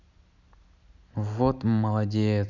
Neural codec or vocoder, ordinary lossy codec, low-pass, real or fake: none; none; 7.2 kHz; real